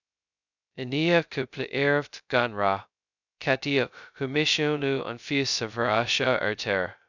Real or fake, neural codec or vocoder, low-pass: fake; codec, 16 kHz, 0.2 kbps, FocalCodec; 7.2 kHz